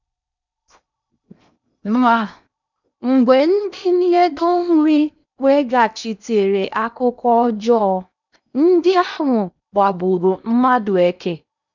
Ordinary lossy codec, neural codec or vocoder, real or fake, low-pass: none; codec, 16 kHz in and 24 kHz out, 0.8 kbps, FocalCodec, streaming, 65536 codes; fake; 7.2 kHz